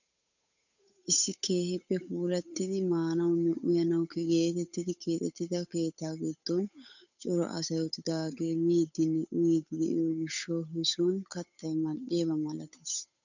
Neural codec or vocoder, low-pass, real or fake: codec, 16 kHz, 8 kbps, FunCodec, trained on Chinese and English, 25 frames a second; 7.2 kHz; fake